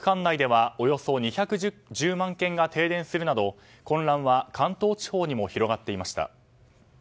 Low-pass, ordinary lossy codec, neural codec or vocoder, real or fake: none; none; none; real